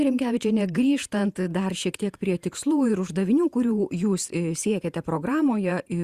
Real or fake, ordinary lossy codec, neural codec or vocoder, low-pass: fake; Opus, 64 kbps; vocoder, 48 kHz, 128 mel bands, Vocos; 14.4 kHz